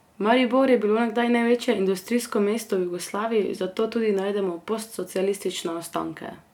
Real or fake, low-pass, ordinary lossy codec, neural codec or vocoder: real; 19.8 kHz; none; none